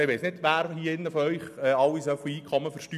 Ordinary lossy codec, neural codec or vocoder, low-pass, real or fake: none; none; 14.4 kHz; real